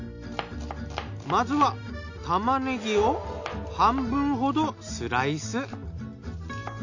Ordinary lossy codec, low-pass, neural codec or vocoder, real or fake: none; 7.2 kHz; none; real